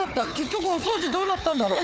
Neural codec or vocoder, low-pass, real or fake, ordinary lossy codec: codec, 16 kHz, 4 kbps, FunCodec, trained on Chinese and English, 50 frames a second; none; fake; none